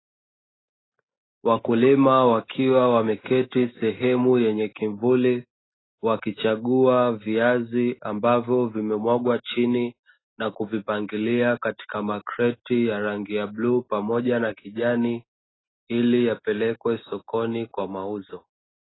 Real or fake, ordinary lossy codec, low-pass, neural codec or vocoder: real; AAC, 16 kbps; 7.2 kHz; none